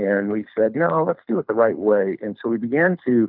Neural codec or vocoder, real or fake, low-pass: codec, 24 kHz, 6 kbps, HILCodec; fake; 5.4 kHz